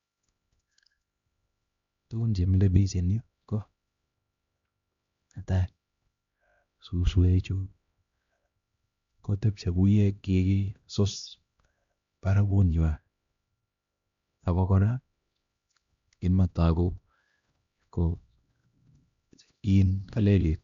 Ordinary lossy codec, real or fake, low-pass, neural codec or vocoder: Opus, 64 kbps; fake; 7.2 kHz; codec, 16 kHz, 1 kbps, X-Codec, HuBERT features, trained on LibriSpeech